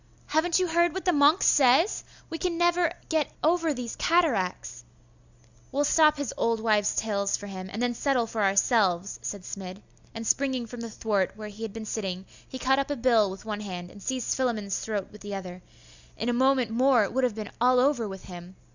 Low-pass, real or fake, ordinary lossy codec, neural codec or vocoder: 7.2 kHz; real; Opus, 64 kbps; none